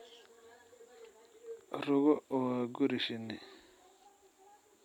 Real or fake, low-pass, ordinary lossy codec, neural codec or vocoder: real; 19.8 kHz; none; none